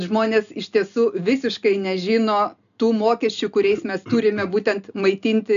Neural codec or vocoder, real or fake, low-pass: none; real; 7.2 kHz